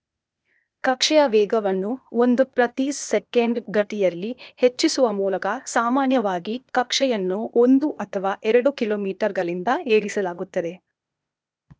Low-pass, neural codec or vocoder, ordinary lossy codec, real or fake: none; codec, 16 kHz, 0.8 kbps, ZipCodec; none; fake